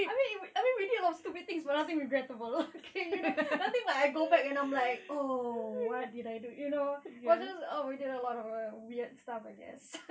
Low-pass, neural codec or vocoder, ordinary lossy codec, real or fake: none; none; none; real